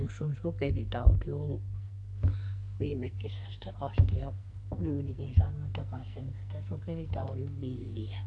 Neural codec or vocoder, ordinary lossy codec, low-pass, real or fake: codec, 44.1 kHz, 2.6 kbps, SNAC; none; 10.8 kHz; fake